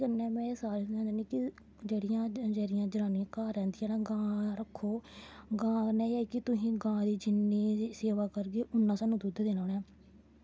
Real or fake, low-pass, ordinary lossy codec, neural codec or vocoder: real; none; none; none